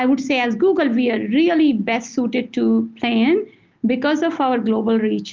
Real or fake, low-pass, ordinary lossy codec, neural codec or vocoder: real; 7.2 kHz; Opus, 32 kbps; none